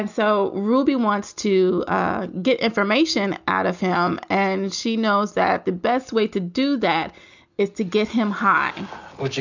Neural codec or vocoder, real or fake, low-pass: none; real; 7.2 kHz